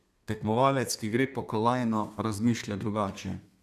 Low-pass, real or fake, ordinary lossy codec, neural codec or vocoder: 14.4 kHz; fake; none; codec, 32 kHz, 1.9 kbps, SNAC